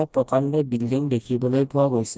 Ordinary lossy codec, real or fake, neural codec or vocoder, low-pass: none; fake; codec, 16 kHz, 1 kbps, FreqCodec, smaller model; none